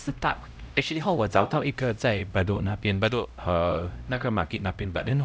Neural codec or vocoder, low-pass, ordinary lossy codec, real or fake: codec, 16 kHz, 0.5 kbps, X-Codec, HuBERT features, trained on LibriSpeech; none; none; fake